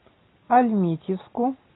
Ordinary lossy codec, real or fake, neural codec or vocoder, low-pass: AAC, 16 kbps; real; none; 7.2 kHz